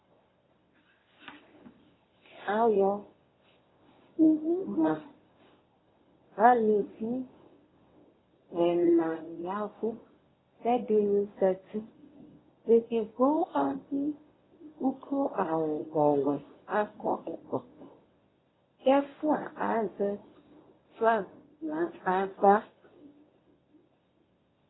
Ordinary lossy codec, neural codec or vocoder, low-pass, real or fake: AAC, 16 kbps; codec, 24 kHz, 0.9 kbps, WavTokenizer, medium speech release version 1; 7.2 kHz; fake